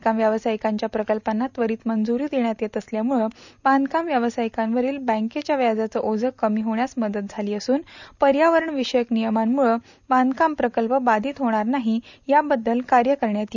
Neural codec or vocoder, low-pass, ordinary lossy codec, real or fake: none; 7.2 kHz; none; real